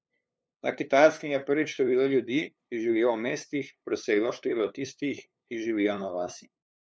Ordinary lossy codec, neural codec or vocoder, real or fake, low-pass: none; codec, 16 kHz, 2 kbps, FunCodec, trained on LibriTTS, 25 frames a second; fake; none